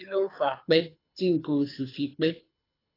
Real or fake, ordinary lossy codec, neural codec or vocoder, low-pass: fake; AAC, 24 kbps; codec, 24 kHz, 6 kbps, HILCodec; 5.4 kHz